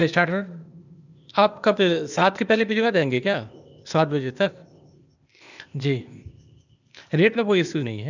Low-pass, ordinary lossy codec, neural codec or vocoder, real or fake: 7.2 kHz; none; codec, 16 kHz, 0.8 kbps, ZipCodec; fake